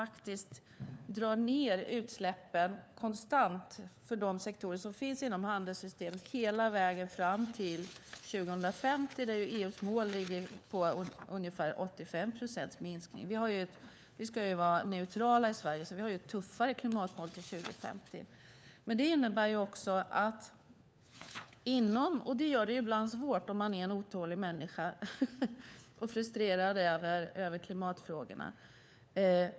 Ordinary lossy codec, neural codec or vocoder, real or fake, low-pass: none; codec, 16 kHz, 4 kbps, FunCodec, trained on LibriTTS, 50 frames a second; fake; none